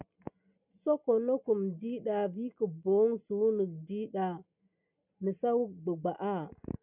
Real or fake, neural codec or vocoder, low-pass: real; none; 3.6 kHz